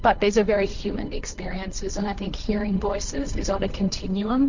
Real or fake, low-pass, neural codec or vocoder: fake; 7.2 kHz; codec, 16 kHz, 1.1 kbps, Voila-Tokenizer